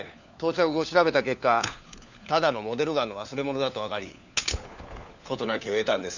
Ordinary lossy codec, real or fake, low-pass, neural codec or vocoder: none; fake; 7.2 kHz; codec, 16 kHz, 4 kbps, FunCodec, trained on LibriTTS, 50 frames a second